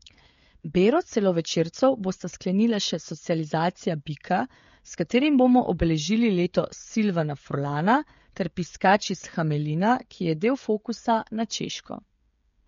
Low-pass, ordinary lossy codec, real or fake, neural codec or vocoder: 7.2 kHz; MP3, 48 kbps; fake; codec, 16 kHz, 16 kbps, FreqCodec, smaller model